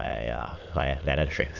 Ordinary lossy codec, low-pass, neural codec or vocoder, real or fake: none; 7.2 kHz; autoencoder, 22.05 kHz, a latent of 192 numbers a frame, VITS, trained on many speakers; fake